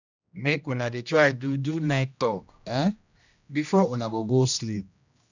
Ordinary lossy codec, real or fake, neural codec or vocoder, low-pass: none; fake; codec, 16 kHz, 1 kbps, X-Codec, HuBERT features, trained on general audio; 7.2 kHz